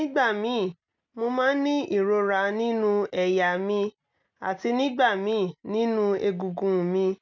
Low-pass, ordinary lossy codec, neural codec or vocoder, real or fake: 7.2 kHz; none; none; real